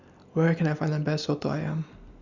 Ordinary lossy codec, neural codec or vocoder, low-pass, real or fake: Opus, 64 kbps; none; 7.2 kHz; real